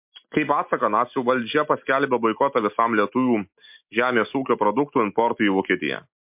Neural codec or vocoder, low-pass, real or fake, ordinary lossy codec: none; 3.6 kHz; real; MP3, 32 kbps